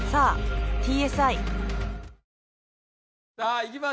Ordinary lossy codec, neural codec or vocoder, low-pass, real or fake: none; none; none; real